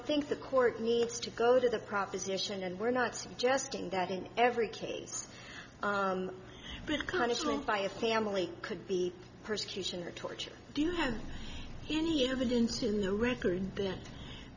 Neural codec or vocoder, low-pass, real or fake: none; 7.2 kHz; real